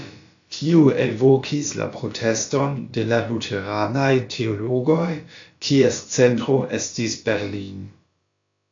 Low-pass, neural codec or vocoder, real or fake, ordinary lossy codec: 7.2 kHz; codec, 16 kHz, about 1 kbps, DyCAST, with the encoder's durations; fake; AAC, 48 kbps